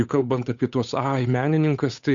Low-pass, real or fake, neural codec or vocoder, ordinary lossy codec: 7.2 kHz; fake; codec, 16 kHz, 8 kbps, FunCodec, trained on Chinese and English, 25 frames a second; MP3, 96 kbps